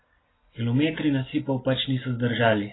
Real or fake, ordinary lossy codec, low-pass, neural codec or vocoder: real; AAC, 16 kbps; 7.2 kHz; none